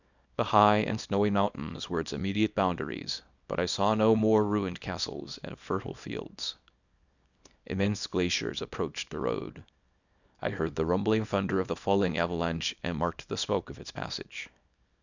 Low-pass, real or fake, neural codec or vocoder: 7.2 kHz; fake; codec, 24 kHz, 0.9 kbps, WavTokenizer, small release